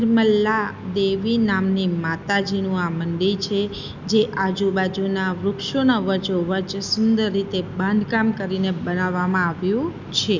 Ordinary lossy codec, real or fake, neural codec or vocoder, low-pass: none; real; none; 7.2 kHz